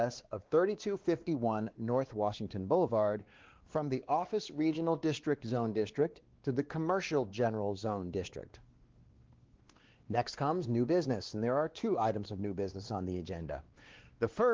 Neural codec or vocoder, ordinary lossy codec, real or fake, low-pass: codec, 16 kHz, 2 kbps, X-Codec, WavLM features, trained on Multilingual LibriSpeech; Opus, 16 kbps; fake; 7.2 kHz